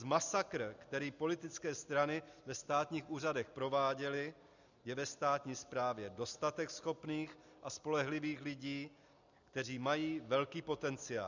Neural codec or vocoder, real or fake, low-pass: none; real; 7.2 kHz